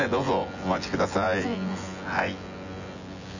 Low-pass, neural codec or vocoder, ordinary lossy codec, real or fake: 7.2 kHz; vocoder, 24 kHz, 100 mel bands, Vocos; none; fake